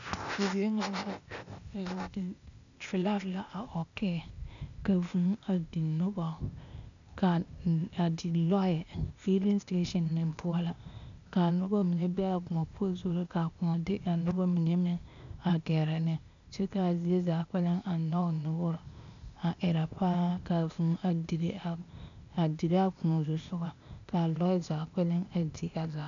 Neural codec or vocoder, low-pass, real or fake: codec, 16 kHz, 0.8 kbps, ZipCodec; 7.2 kHz; fake